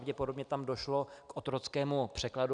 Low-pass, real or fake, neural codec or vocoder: 9.9 kHz; real; none